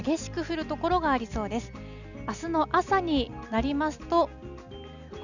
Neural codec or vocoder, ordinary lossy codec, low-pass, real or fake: none; none; 7.2 kHz; real